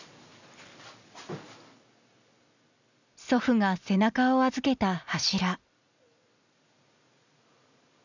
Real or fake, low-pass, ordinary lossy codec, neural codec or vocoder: real; 7.2 kHz; none; none